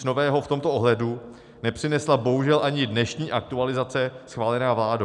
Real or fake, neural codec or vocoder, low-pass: real; none; 10.8 kHz